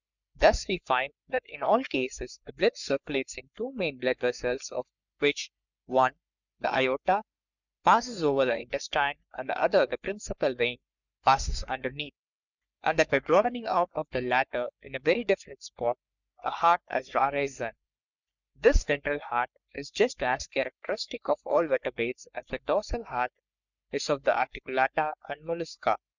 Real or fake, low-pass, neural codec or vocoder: fake; 7.2 kHz; codec, 44.1 kHz, 3.4 kbps, Pupu-Codec